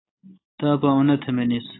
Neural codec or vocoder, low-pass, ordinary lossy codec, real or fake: none; 7.2 kHz; AAC, 16 kbps; real